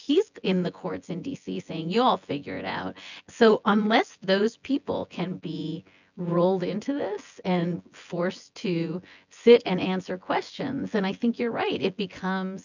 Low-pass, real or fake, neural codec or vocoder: 7.2 kHz; fake; vocoder, 24 kHz, 100 mel bands, Vocos